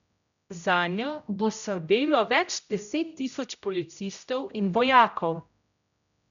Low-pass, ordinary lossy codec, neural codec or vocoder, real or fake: 7.2 kHz; none; codec, 16 kHz, 0.5 kbps, X-Codec, HuBERT features, trained on general audio; fake